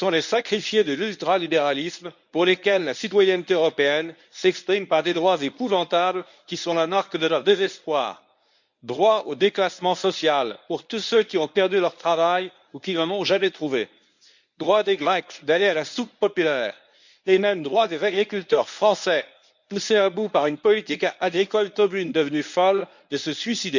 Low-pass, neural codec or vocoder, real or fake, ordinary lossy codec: 7.2 kHz; codec, 24 kHz, 0.9 kbps, WavTokenizer, medium speech release version 1; fake; none